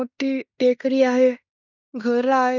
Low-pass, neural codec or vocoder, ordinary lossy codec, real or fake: 7.2 kHz; codec, 16 kHz in and 24 kHz out, 0.9 kbps, LongCat-Audio-Codec, fine tuned four codebook decoder; none; fake